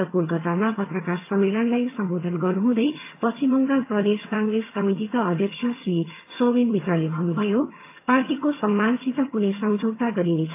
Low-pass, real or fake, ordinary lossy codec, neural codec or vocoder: 3.6 kHz; fake; AAC, 24 kbps; vocoder, 22.05 kHz, 80 mel bands, HiFi-GAN